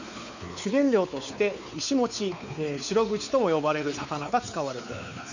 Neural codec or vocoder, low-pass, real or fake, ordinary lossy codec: codec, 16 kHz, 4 kbps, X-Codec, WavLM features, trained on Multilingual LibriSpeech; 7.2 kHz; fake; none